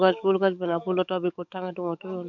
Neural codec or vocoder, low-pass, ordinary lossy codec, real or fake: codec, 44.1 kHz, 7.8 kbps, DAC; 7.2 kHz; none; fake